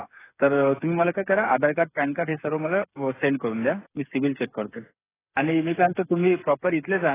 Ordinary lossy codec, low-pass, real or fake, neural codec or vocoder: AAC, 16 kbps; 3.6 kHz; fake; codec, 16 kHz, 8 kbps, FreqCodec, smaller model